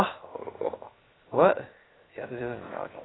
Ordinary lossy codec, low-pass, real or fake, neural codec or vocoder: AAC, 16 kbps; 7.2 kHz; fake; codec, 16 kHz, 0.7 kbps, FocalCodec